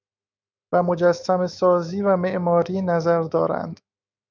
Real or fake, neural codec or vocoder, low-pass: fake; autoencoder, 48 kHz, 128 numbers a frame, DAC-VAE, trained on Japanese speech; 7.2 kHz